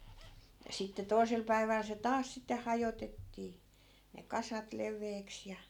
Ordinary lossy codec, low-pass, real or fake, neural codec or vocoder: none; 19.8 kHz; real; none